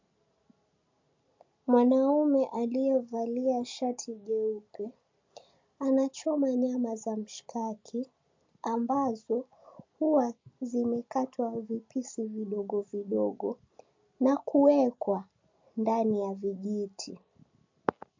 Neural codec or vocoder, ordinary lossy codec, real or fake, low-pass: none; MP3, 48 kbps; real; 7.2 kHz